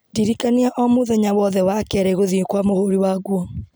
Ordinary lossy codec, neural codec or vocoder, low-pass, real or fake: none; none; none; real